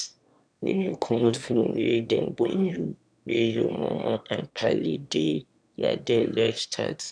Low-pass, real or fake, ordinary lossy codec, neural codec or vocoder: 9.9 kHz; fake; none; autoencoder, 22.05 kHz, a latent of 192 numbers a frame, VITS, trained on one speaker